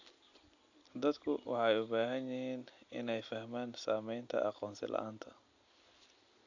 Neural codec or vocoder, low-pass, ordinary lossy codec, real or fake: none; 7.2 kHz; AAC, 48 kbps; real